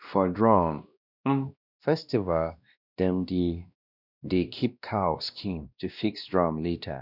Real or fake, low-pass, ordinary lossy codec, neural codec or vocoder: fake; 5.4 kHz; none; codec, 16 kHz, 1 kbps, X-Codec, WavLM features, trained on Multilingual LibriSpeech